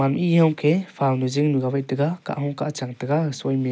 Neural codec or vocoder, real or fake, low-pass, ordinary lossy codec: none; real; none; none